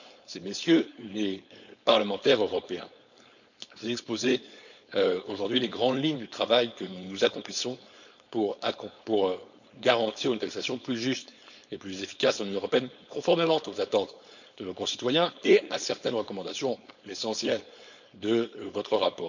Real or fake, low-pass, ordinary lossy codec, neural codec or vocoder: fake; 7.2 kHz; none; codec, 16 kHz, 4.8 kbps, FACodec